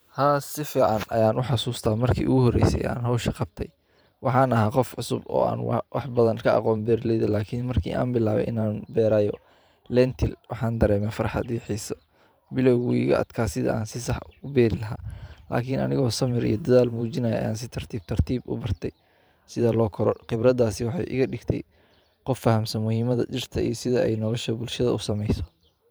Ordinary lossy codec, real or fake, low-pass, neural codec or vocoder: none; fake; none; vocoder, 44.1 kHz, 128 mel bands every 512 samples, BigVGAN v2